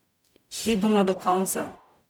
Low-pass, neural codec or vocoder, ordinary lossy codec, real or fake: none; codec, 44.1 kHz, 0.9 kbps, DAC; none; fake